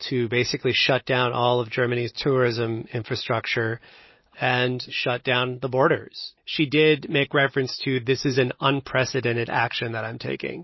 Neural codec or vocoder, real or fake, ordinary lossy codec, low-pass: none; real; MP3, 24 kbps; 7.2 kHz